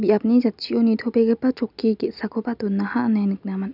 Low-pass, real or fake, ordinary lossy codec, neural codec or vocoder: 5.4 kHz; real; none; none